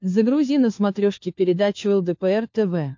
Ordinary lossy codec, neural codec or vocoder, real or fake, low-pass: MP3, 48 kbps; codec, 16 kHz, 4 kbps, FunCodec, trained on LibriTTS, 50 frames a second; fake; 7.2 kHz